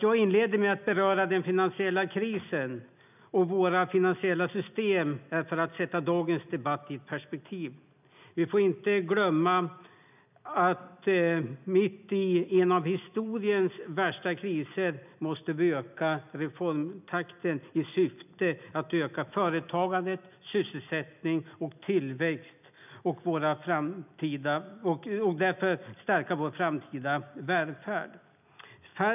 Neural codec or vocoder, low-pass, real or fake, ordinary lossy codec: none; 3.6 kHz; real; none